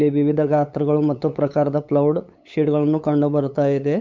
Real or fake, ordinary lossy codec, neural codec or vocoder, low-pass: fake; MP3, 64 kbps; codec, 16 kHz, 8 kbps, FunCodec, trained on LibriTTS, 25 frames a second; 7.2 kHz